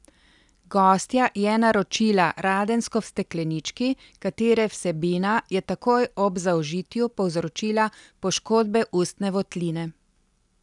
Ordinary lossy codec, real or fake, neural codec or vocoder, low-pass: none; real; none; 10.8 kHz